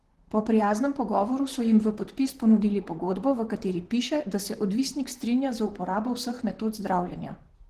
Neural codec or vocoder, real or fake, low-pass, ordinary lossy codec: vocoder, 44.1 kHz, 128 mel bands, Pupu-Vocoder; fake; 14.4 kHz; Opus, 16 kbps